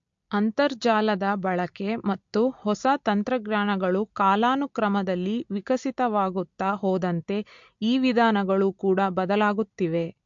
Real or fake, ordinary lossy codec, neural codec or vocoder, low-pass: real; MP3, 48 kbps; none; 7.2 kHz